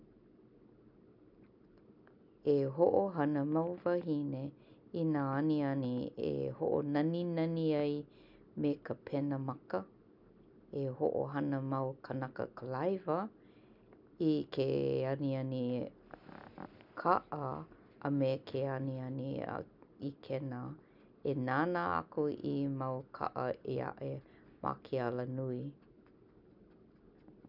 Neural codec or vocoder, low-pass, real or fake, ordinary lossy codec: none; 5.4 kHz; real; none